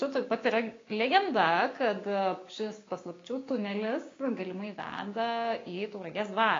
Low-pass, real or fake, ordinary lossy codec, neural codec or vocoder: 7.2 kHz; fake; AAC, 32 kbps; codec, 16 kHz, 6 kbps, DAC